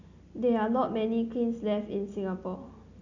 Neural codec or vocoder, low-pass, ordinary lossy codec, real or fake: none; 7.2 kHz; none; real